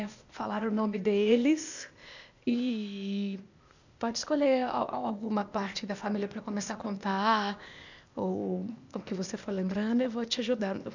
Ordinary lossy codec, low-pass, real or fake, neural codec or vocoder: none; 7.2 kHz; fake; codec, 16 kHz, 0.8 kbps, ZipCodec